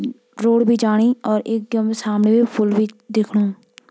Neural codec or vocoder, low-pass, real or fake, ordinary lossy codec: none; none; real; none